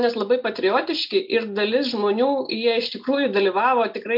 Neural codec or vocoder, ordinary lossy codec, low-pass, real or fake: none; MP3, 48 kbps; 5.4 kHz; real